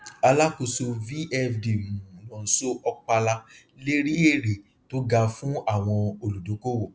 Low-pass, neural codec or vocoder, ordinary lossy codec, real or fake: none; none; none; real